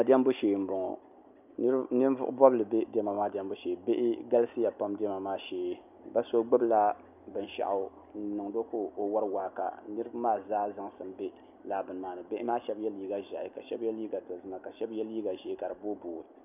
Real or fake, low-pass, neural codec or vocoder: real; 3.6 kHz; none